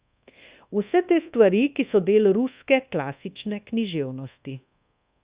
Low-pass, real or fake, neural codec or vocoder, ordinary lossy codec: 3.6 kHz; fake; codec, 24 kHz, 1.2 kbps, DualCodec; Opus, 64 kbps